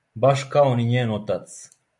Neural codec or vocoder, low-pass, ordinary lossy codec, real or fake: none; 10.8 kHz; AAC, 64 kbps; real